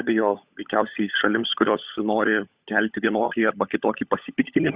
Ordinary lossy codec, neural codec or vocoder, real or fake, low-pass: Opus, 64 kbps; codec, 16 kHz, 16 kbps, FunCodec, trained on LibriTTS, 50 frames a second; fake; 3.6 kHz